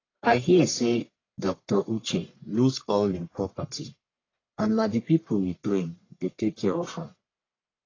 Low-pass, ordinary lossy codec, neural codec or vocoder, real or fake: 7.2 kHz; AAC, 32 kbps; codec, 44.1 kHz, 1.7 kbps, Pupu-Codec; fake